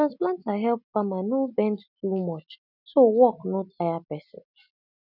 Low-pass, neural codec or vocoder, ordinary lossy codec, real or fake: 5.4 kHz; none; none; real